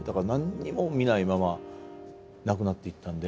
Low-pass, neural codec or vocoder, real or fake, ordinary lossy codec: none; none; real; none